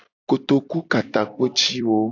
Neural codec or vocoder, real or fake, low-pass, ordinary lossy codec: vocoder, 44.1 kHz, 128 mel bands every 256 samples, BigVGAN v2; fake; 7.2 kHz; AAC, 48 kbps